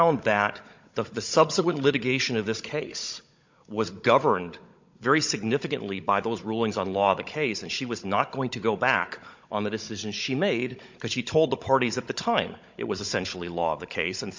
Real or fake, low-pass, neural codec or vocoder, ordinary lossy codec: fake; 7.2 kHz; codec, 16 kHz, 16 kbps, FunCodec, trained on Chinese and English, 50 frames a second; MP3, 64 kbps